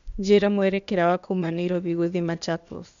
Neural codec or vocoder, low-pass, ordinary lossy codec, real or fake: codec, 16 kHz, 0.8 kbps, ZipCodec; 7.2 kHz; none; fake